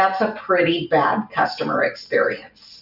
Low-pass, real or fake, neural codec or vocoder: 5.4 kHz; real; none